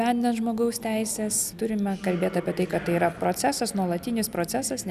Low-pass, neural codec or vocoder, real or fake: 14.4 kHz; none; real